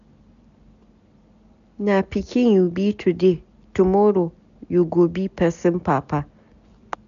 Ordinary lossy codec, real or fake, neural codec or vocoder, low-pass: none; real; none; 7.2 kHz